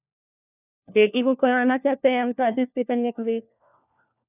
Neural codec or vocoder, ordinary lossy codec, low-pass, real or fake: codec, 16 kHz, 1 kbps, FunCodec, trained on LibriTTS, 50 frames a second; none; 3.6 kHz; fake